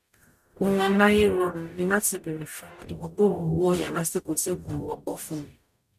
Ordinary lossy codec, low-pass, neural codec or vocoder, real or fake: none; 14.4 kHz; codec, 44.1 kHz, 0.9 kbps, DAC; fake